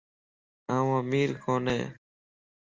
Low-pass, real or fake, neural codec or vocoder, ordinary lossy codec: 7.2 kHz; real; none; Opus, 32 kbps